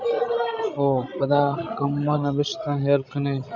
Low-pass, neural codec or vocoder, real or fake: 7.2 kHz; codec, 16 kHz, 16 kbps, FreqCodec, larger model; fake